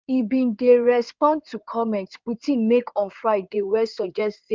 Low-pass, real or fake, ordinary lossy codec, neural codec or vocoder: 7.2 kHz; fake; Opus, 32 kbps; codec, 16 kHz in and 24 kHz out, 2.2 kbps, FireRedTTS-2 codec